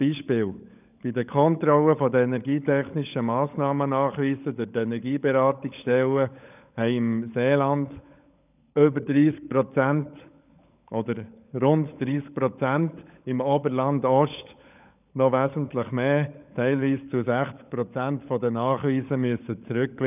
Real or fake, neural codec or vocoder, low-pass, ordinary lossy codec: fake; codec, 16 kHz, 16 kbps, FunCodec, trained on LibriTTS, 50 frames a second; 3.6 kHz; none